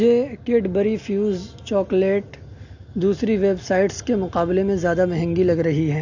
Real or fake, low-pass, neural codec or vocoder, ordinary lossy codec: real; 7.2 kHz; none; AAC, 48 kbps